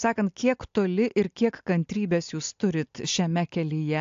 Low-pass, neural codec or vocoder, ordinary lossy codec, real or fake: 7.2 kHz; none; AAC, 64 kbps; real